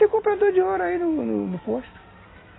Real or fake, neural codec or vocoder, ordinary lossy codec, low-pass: real; none; AAC, 16 kbps; 7.2 kHz